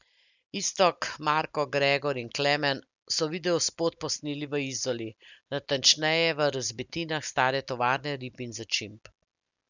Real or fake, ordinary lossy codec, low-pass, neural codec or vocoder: real; none; 7.2 kHz; none